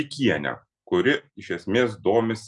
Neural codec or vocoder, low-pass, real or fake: none; 10.8 kHz; real